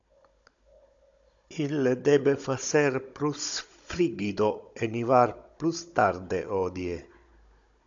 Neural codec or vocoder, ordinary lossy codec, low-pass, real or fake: codec, 16 kHz, 16 kbps, FunCodec, trained on Chinese and English, 50 frames a second; AAC, 64 kbps; 7.2 kHz; fake